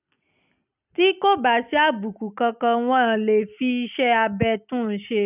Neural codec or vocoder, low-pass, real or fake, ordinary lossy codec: none; 3.6 kHz; real; none